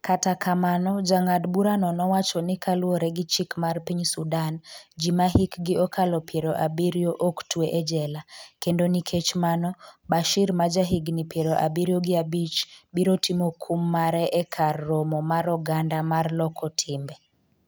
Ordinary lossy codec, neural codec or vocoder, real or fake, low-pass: none; none; real; none